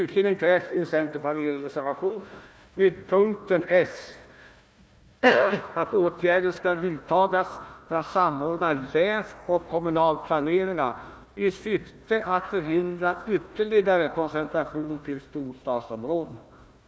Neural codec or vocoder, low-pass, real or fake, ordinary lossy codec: codec, 16 kHz, 1 kbps, FunCodec, trained on Chinese and English, 50 frames a second; none; fake; none